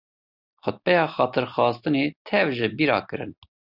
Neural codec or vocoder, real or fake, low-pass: none; real; 5.4 kHz